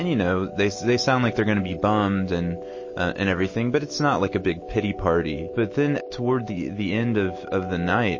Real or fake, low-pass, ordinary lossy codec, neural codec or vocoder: real; 7.2 kHz; MP3, 32 kbps; none